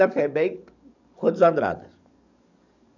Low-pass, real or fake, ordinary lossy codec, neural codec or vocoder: 7.2 kHz; fake; none; codec, 44.1 kHz, 7.8 kbps, DAC